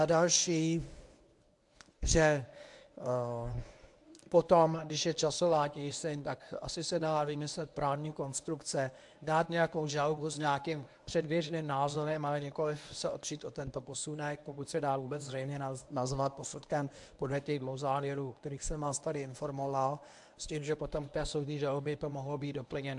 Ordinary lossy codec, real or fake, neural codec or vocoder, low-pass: AAC, 64 kbps; fake; codec, 24 kHz, 0.9 kbps, WavTokenizer, medium speech release version 1; 10.8 kHz